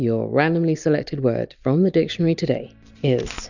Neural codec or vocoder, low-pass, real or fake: none; 7.2 kHz; real